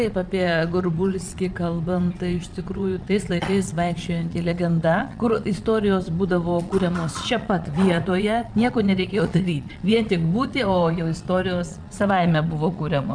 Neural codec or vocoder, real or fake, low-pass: vocoder, 22.05 kHz, 80 mel bands, Vocos; fake; 9.9 kHz